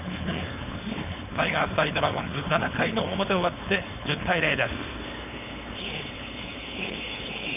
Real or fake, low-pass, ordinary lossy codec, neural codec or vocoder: fake; 3.6 kHz; none; codec, 16 kHz, 4.8 kbps, FACodec